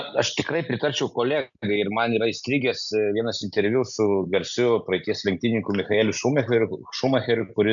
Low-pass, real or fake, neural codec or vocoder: 7.2 kHz; real; none